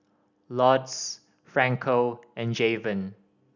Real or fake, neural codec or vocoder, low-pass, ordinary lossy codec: real; none; 7.2 kHz; none